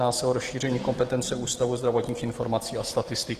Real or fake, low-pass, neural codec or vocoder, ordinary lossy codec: fake; 14.4 kHz; autoencoder, 48 kHz, 128 numbers a frame, DAC-VAE, trained on Japanese speech; Opus, 16 kbps